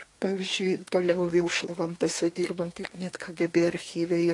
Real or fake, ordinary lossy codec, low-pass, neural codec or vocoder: fake; AAC, 48 kbps; 10.8 kHz; codec, 24 kHz, 1 kbps, SNAC